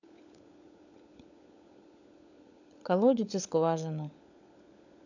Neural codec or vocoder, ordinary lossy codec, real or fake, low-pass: codec, 16 kHz, 16 kbps, FunCodec, trained on LibriTTS, 50 frames a second; none; fake; 7.2 kHz